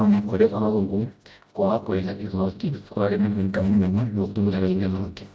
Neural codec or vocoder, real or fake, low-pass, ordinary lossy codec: codec, 16 kHz, 0.5 kbps, FreqCodec, smaller model; fake; none; none